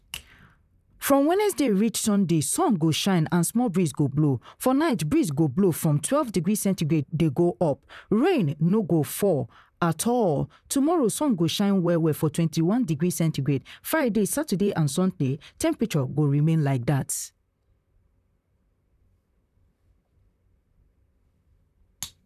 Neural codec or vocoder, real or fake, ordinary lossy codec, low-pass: vocoder, 44.1 kHz, 128 mel bands, Pupu-Vocoder; fake; none; 14.4 kHz